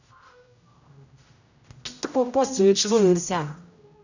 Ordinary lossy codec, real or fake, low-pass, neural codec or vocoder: none; fake; 7.2 kHz; codec, 16 kHz, 0.5 kbps, X-Codec, HuBERT features, trained on general audio